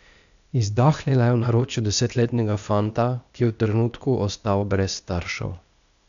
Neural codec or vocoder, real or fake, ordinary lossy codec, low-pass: codec, 16 kHz, 0.8 kbps, ZipCodec; fake; none; 7.2 kHz